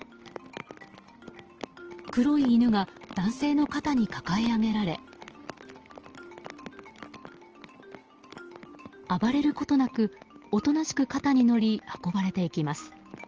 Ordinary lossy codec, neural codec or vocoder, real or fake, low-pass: Opus, 16 kbps; none; real; 7.2 kHz